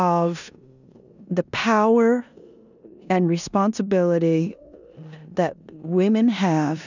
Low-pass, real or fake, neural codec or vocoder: 7.2 kHz; fake; codec, 16 kHz in and 24 kHz out, 0.9 kbps, LongCat-Audio-Codec, four codebook decoder